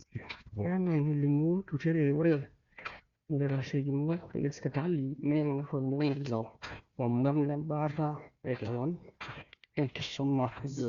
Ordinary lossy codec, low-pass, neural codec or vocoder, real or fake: Opus, 64 kbps; 7.2 kHz; codec, 16 kHz, 1 kbps, FunCodec, trained on Chinese and English, 50 frames a second; fake